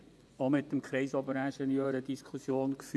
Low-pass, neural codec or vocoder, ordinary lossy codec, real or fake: none; vocoder, 24 kHz, 100 mel bands, Vocos; none; fake